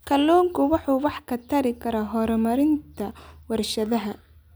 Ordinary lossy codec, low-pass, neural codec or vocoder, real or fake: none; none; none; real